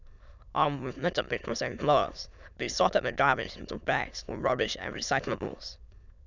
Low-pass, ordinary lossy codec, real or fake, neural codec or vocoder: 7.2 kHz; none; fake; autoencoder, 22.05 kHz, a latent of 192 numbers a frame, VITS, trained on many speakers